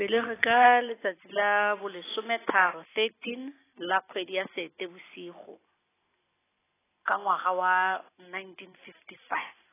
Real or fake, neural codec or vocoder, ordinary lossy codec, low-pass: real; none; AAC, 16 kbps; 3.6 kHz